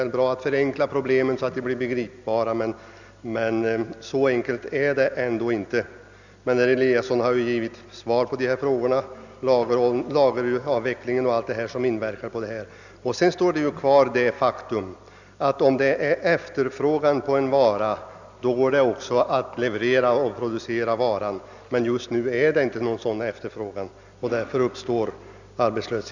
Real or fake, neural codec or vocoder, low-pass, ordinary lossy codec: real; none; 7.2 kHz; none